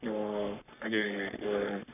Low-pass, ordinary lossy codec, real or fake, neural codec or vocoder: 3.6 kHz; none; fake; codec, 44.1 kHz, 3.4 kbps, Pupu-Codec